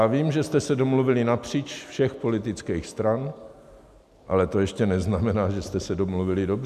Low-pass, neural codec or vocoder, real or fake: 14.4 kHz; none; real